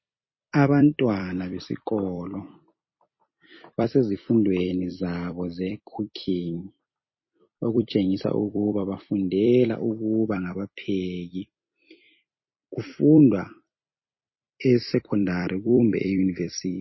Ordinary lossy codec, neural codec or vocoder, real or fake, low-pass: MP3, 24 kbps; vocoder, 44.1 kHz, 128 mel bands every 256 samples, BigVGAN v2; fake; 7.2 kHz